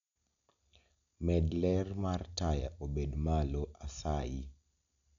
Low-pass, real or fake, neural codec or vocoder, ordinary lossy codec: 7.2 kHz; real; none; none